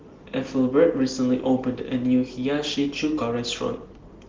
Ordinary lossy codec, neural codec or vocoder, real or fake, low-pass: Opus, 16 kbps; none; real; 7.2 kHz